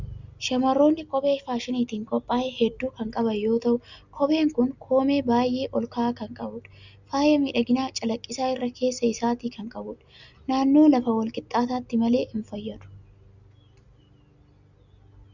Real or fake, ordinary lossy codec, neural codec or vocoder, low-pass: real; Opus, 64 kbps; none; 7.2 kHz